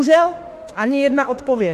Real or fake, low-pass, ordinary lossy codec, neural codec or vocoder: fake; 14.4 kHz; AAC, 64 kbps; autoencoder, 48 kHz, 32 numbers a frame, DAC-VAE, trained on Japanese speech